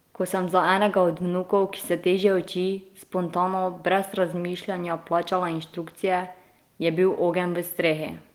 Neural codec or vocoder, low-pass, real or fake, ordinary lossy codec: none; 19.8 kHz; real; Opus, 24 kbps